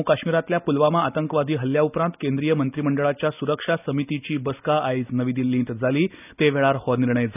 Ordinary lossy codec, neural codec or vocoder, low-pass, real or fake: none; none; 3.6 kHz; real